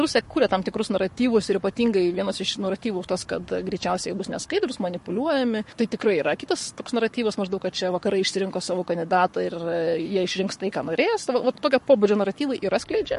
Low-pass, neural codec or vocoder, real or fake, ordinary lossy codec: 14.4 kHz; codec, 44.1 kHz, 7.8 kbps, Pupu-Codec; fake; MP3, 48 kbps